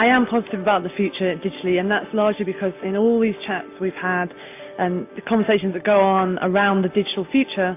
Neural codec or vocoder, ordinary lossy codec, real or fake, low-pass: none; AAC, 24 kbps; real; 3.6 kHz